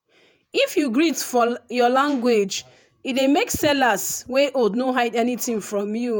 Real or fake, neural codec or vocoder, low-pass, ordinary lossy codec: fake; vocoder, 48 kHz, 128 mel bands, Vocos; none; none